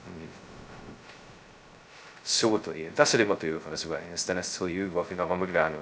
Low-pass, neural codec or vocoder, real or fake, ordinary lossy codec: none; codec, 16 kHz, 0.2 kbps, FocalCodec; fake; none